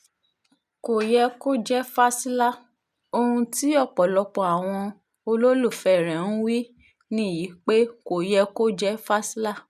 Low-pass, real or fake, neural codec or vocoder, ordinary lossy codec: 14.4 kHz; real; none; none